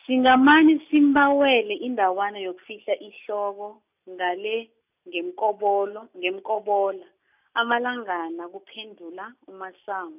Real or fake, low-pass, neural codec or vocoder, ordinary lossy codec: real; 3.6 kHz; none; none